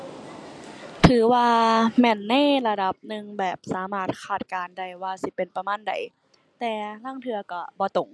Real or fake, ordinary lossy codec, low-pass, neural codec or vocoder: real; none; none; none